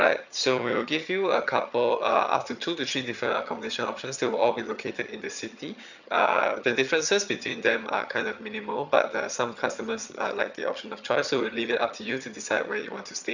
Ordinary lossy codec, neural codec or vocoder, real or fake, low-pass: none; vocoder, 22.05 kHz, 80 mel bands, HiFi-GAN; fake; 7.2 kHz